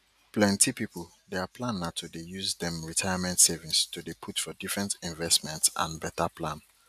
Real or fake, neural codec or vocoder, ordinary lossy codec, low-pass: real; none; none; 14.4 kHz